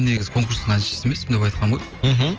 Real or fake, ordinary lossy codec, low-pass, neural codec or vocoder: real; Opus, 24 kbps; 7.2 kHz; none